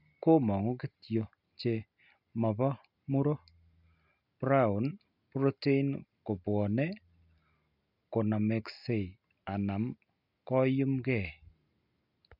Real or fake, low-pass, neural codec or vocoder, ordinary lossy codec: real; 5.4 kHz; none; none